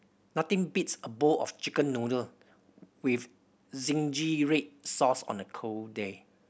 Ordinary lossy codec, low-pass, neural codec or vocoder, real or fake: none; none; none; real